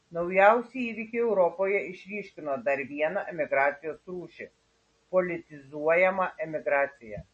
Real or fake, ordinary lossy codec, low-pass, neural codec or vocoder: real; MP3, 32 kbps; 9.9 kHz; none